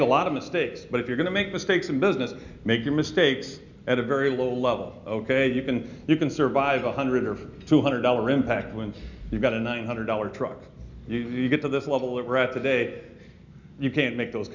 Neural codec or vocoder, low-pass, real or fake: none; 7.2 kHz; real